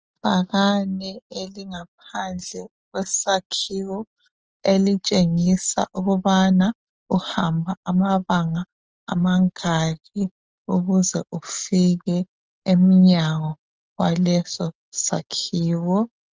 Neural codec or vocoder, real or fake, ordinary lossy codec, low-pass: none; real; Opus, 24 kbps; 7.2 kHz